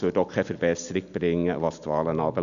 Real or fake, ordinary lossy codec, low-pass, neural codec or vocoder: real; none; 7.2 kHz; none